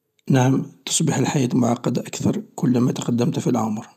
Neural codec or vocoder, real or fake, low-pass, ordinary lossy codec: none; real; 14.4 kHz; none